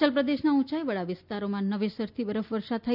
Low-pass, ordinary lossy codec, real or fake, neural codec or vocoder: 5.4 kHz; none; real; none